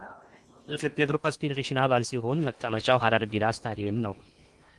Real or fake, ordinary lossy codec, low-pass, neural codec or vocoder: fake; Opus, 32 kbps; 10.8 kHz; codec, 16 kHz in and 24 kHz out, 0.8 kbps, FocalCodec, streaming, 65536 codes